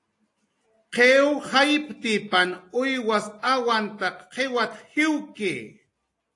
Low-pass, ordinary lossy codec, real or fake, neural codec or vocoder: 10.8 kHz; AAC, 48 kbps; real; none